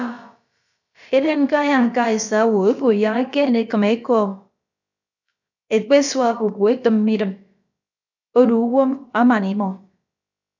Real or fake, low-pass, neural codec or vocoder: fake; 7.2 kHz; codec, 16 kHz, about 1 kbps, DyCAST, with the encoder's durations